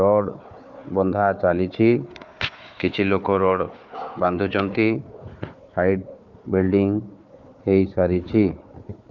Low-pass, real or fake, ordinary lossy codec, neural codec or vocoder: 7.2 kHz; fake; none; codec, 16 kHz, 4 kbps, FunCodec, trained on Chinese and English, 50 frames a second